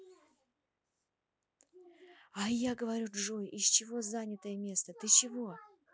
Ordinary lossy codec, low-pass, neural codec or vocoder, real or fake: none; none; none; real